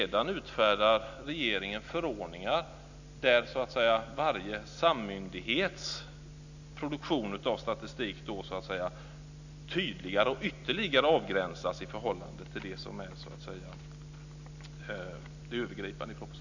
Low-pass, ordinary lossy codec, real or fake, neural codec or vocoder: 7.2 kHz; none; real; none